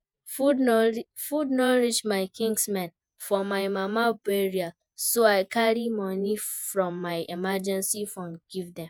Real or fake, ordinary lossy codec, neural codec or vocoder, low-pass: fake; none; vocoder, 48 kHz, 128 mel bands, Vocos; none